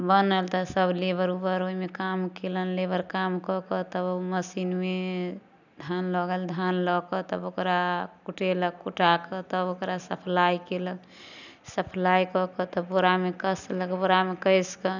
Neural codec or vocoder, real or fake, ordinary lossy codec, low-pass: none; real; none; 7.2 kHz